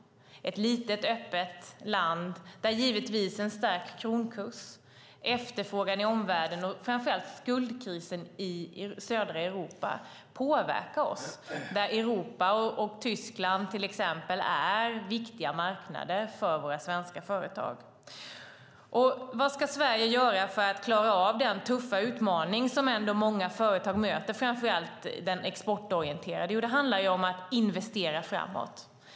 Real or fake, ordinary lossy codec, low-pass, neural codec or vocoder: real; none; none; none